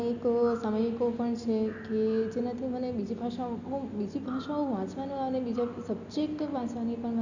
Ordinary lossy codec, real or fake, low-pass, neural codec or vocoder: none; real; 7.2 kHz; none